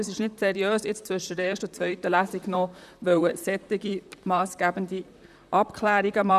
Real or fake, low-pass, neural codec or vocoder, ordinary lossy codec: fake; 14.4 kHz; vocoder, 44.1 kHz, 128 mel bands, Pupu-Vocoder; none